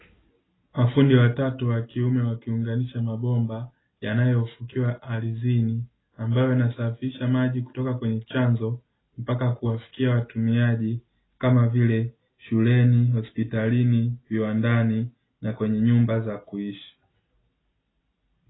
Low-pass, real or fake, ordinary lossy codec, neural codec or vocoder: 7.2 kHz; real; AAC, 16 kbps; none